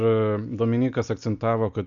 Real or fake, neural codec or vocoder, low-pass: real; none; 7.2 kHz